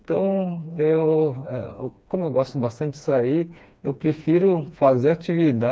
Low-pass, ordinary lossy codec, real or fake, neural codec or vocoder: none; none; fake; codec, 16 kHz, 2 kbps, FreqCodec, smaller model